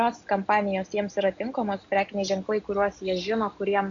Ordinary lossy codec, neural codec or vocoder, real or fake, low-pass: AAC, 48 kbps; none; real; 7.2 kHz